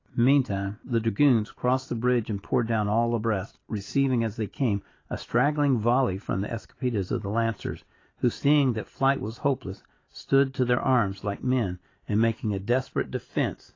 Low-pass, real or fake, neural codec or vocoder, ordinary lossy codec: 7.2 kHz; real; none; AAC, 32 kbps